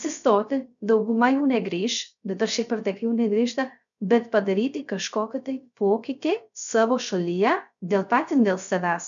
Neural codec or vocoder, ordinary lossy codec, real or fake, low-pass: codec, 16 kHz, 0.3 kbps, FocalCodec; MP3, 96 kbps; fake; 7.2 kHz